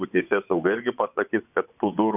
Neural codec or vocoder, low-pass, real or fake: vocoder, 24 kHz, 100 mel bands, Vocos; 3.6 kHz; fake